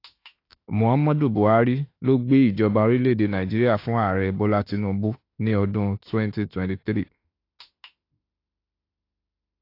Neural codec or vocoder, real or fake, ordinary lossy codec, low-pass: autoencoder, 48 kHz, 32 numbers a frame, DAC-VAE, trained on Japanese speech; fake; AAC, 32 kbps; 5.4 kHz